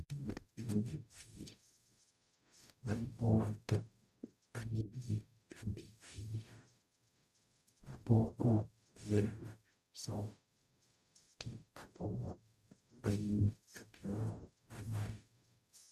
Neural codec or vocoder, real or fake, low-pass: codec, 44.1 kHz, 0.9 kbps, DAC; fake; 14.4 kHz